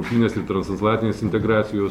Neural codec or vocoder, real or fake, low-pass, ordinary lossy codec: none; real; 19.8 kHz; MP3, 96 kbps